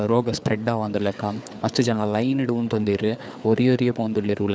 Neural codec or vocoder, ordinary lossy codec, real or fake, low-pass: codec, 16 kHz, 4 kbps, FreqCodec, larger model; none; fake; none